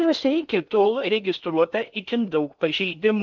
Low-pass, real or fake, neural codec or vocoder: 7.2 kHz; fake; codec, 16 kHz in and 24 kHz out, 0.8 kbps, FocalCodec, streaming, 65536 codes